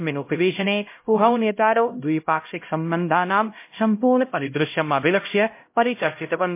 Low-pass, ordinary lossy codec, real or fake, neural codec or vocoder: 3.6 kHz; MP3, 24 kbps; fake; codec, 16 kHz, 0.5 kbps, X-Codec, HuBERT features, trained on LibriSpeech